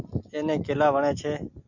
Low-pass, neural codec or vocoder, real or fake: 7.2 kHz; none; real